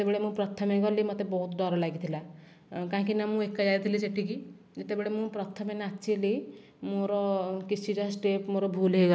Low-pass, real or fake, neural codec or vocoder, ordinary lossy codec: none; real; none; none